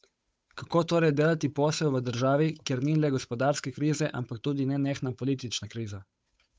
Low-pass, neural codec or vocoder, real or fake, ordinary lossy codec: none; codec, 16 kHz, 8 kbps, FunCodec, trained on Chinese and English, 25 frames a second; fake; none